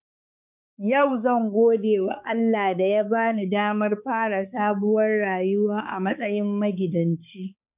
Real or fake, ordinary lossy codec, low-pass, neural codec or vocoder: fake; none; 3.6 kHz; codec, 16 kHz, 4 kbps, X-Codec, HuBERT features, trained on balanced general audio